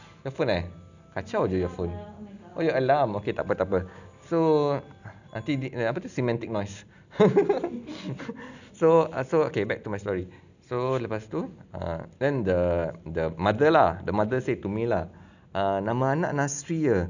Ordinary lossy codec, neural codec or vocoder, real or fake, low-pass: none; none; real; 7.2 kHz